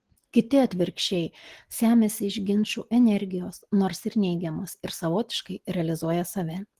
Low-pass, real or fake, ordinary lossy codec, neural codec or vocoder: 14.4 kHz; real; Opus, 16 kbps; none